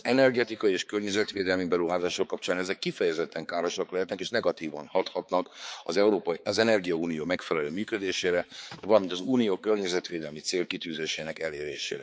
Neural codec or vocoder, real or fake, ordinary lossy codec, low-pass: codec, 16 kHz, 4 kbps, X-Codec, HuBERT features, trained on balanced general audio; fake; none; none